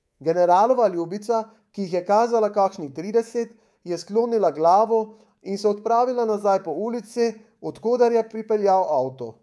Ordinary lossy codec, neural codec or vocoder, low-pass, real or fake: none; codec, 24 kHz, 3.1 kbps, DualCodec; none; fake